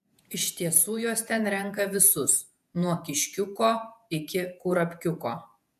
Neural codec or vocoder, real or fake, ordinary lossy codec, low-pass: vocoder, 48 kHz, 128 mel bands, Vocos; fake; AAC, 96 kbps; 14.4 kHz